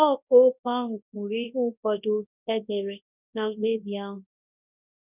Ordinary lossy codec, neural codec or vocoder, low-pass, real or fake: none; codec, 24 kHz, 0.9 kbps, WavTokenizer, large speech release; 3.6 kHz; fake